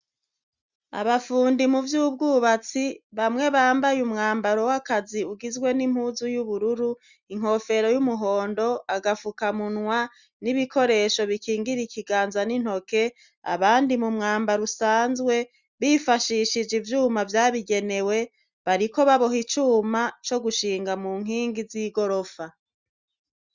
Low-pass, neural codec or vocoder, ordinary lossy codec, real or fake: 7.2 kHz; none; Opus, 64 kbps; real